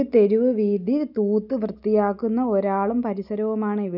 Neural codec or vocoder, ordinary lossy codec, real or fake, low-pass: none; none; real; 5.4 kHz